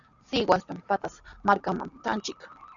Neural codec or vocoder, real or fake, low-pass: none; real; 7.2 kHz